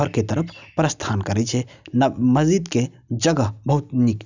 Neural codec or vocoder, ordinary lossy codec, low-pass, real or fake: none; none; 7.2 kHz; real